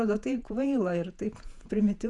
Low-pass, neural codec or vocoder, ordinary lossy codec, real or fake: 10.8 kHz; vocoder, 48 kHz, 128 mel bands, Vocos; Opus, 64 kbps; fake